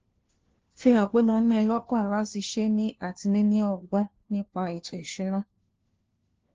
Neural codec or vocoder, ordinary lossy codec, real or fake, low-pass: codec, 16 kHz, 1 kbps, FunCodec, trained on LibriTTS, 50 frames a second; Opus, 16 kbps; fake; 7.2 kHz